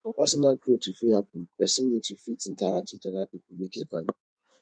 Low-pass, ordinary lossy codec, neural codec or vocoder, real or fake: 9.9 kHz; none; codec, 16 kHz in and 24 kHz out, 1.1 kbps, FireRedTTS-2 codec; fake